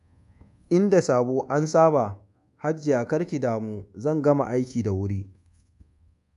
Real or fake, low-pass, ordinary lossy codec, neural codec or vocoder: fake; 10.8 kHz; none; codec, 24 kHz, 1.2 kbps, DualCodec